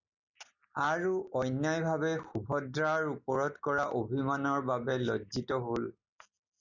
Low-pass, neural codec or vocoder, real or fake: 7.2 kHz; none; real